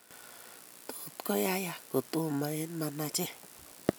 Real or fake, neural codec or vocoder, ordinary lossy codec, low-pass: real; none; none; none